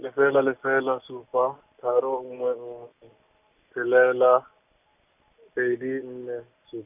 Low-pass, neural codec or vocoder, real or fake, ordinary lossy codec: 3.6 kHz; none; real; none